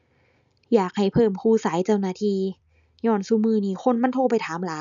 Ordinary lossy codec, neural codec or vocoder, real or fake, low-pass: none; none; real; 7.2 kHz